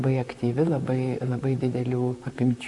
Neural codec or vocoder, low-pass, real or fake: vocoder, 44.1 kHz, 128 mel bands, Pupu-Vocoder; 10.8 kHz; fake